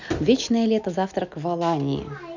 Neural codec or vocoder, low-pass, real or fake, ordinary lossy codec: none; 7.2 kHz; real; none